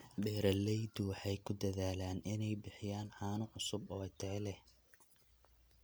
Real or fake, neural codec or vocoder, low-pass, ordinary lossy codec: real; none; none; none